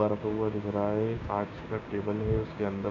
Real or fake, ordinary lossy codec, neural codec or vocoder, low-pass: fake; none; codec, 16 kHz, 6 kbps, DAC; 7.2 kHz